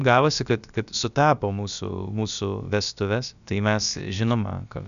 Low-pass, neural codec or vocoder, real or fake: 7.2 kHz; codec, 16 kHz, about 1 kbps, DyCAST, with the encoder's durations; fake